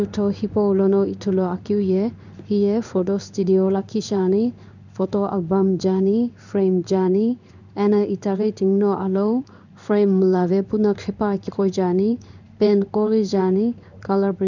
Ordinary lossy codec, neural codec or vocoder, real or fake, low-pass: none; codec, 16 kHz in and 24 kHz out, 1 kbps, XY-Tokenizer; fake; 7.2 kHz